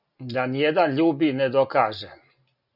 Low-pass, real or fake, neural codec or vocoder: 5.4 kHz; real; none